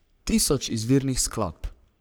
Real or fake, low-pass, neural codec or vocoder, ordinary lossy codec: fake; none; codec, 44.1 kHz, 3.4 kbps, Pupu-Codec; none